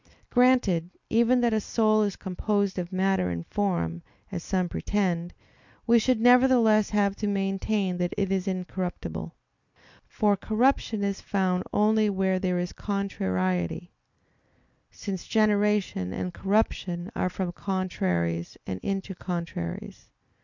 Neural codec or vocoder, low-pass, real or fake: none; 7.2 kHz; real